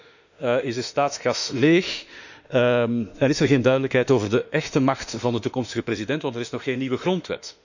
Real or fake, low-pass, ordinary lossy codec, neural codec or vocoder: fake; 7.2 kHz; none; autoencoder, 48 kHz, 32 numbers a frame, DAC-VAE, trained on Japanese speech